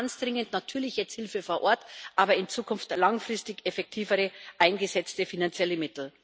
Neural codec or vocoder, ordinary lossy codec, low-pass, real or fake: none; none; none; real